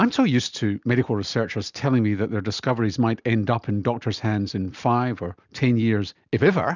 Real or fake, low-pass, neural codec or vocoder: real; 7.2 kHz; none